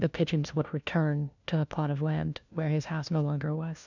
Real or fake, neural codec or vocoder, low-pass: fake; codec, 16 kHz, 1 kbps, FunCodec, trained on LibriTTS, 50 frames a second; 7.2 kHz